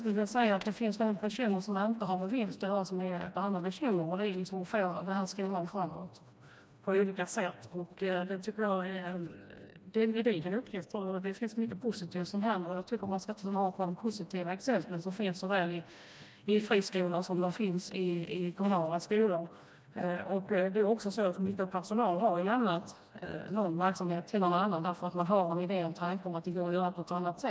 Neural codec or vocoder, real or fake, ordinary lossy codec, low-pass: codec, 16 kHz, 1 kbps, FreqCodec, smaller model; fake; none; none